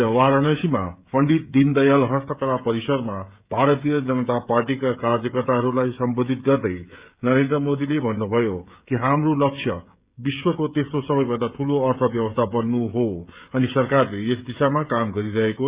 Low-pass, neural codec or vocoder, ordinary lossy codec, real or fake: 3.6 kHz; codec, 16 kHz, 16 kbps, FreqCodec, smaller model; Opus, 64 kbps; fake